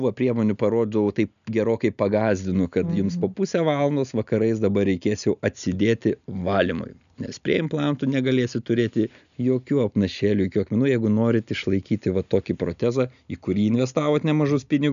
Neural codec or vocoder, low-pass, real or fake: none; 7.2 kHz; real